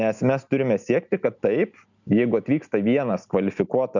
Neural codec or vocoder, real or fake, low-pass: none; real; 7.2 kHz